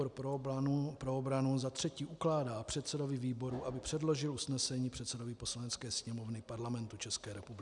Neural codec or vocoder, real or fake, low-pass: none; real; 10.8 kHz